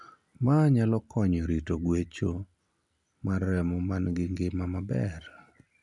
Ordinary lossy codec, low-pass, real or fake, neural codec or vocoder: none; 10.8 kHz; real; none